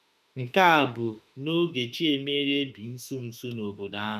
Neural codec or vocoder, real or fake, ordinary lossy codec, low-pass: autoencoder, 48 kHz, 32 numbers a frame, DAC-VAE, trained on Japanese speech; fake; none; 14.4 kHz